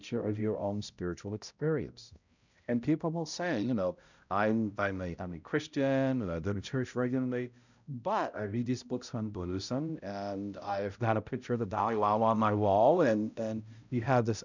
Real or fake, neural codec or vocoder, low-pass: fake; codec, 16 kHz, 0.5 kbps, X-Codec, HuBERT features, trained on balanced general audio; 7.2 kHz